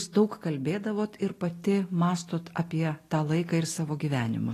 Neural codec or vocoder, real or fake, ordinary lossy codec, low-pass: none; real; AAC, 48 kbps; 14.4 kHz